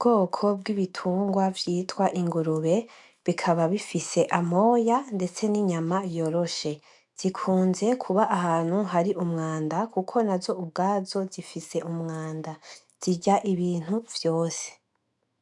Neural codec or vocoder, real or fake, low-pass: autoencoder, 48 kHz, 128 numbers a frame, DAC-VAE, trained on Japanese speech; fake; 10.8 kHz